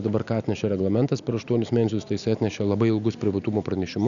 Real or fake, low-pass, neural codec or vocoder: real; 7.2 kHz; none